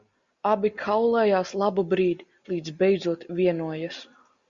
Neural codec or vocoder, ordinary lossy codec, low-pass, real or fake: none; Opus, 64 kbps; 7.2 kHz; real